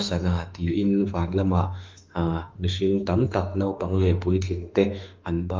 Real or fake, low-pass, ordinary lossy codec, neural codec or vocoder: fake; 7.2 kHz; Opus, 24 kbps; autoencoder, 48 kHz, 32 numbers a frame, DAC-VAE, trained on Japanese speech